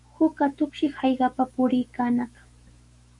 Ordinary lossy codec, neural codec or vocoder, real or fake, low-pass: MP3, 48 kbps; autoencoder, 48 kHz, 128 numbers a frame, DAC-VAE, trained on Japanese speech; fake; 10.8 kHz